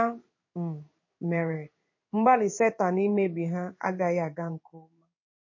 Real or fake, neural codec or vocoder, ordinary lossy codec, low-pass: fake; codec, 16 kHz in and 24 kHz out, 1 kbps, XY-Tokenizer; MP3, 32 kbps; 7.2 kHz